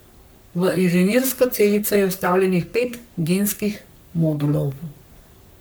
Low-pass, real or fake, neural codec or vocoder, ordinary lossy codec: none; fake; codec, 44.1 kHz, 3.4 kbps, Pupu-Codec; none